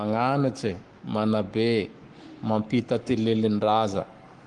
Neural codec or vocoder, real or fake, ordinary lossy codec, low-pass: codec, 44.1 kHz, 7.8 kbps, Pupu-Codec; fake; Opus, 24 kbps; 10.8 kHz